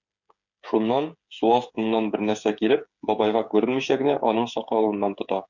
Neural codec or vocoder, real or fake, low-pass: codec, 16 kHz, 8 kbps, FreqCodec, smaller model; fake; 7.2 kHz